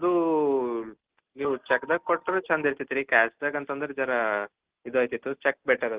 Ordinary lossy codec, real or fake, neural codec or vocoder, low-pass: Opus, 16 kbps; real; none; 3.6 kHz